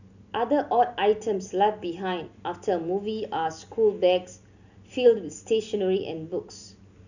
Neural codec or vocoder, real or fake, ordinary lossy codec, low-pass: none; real; none; 7.2 kHz